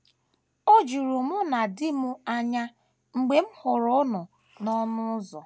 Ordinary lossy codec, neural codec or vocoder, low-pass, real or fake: none; none; none; real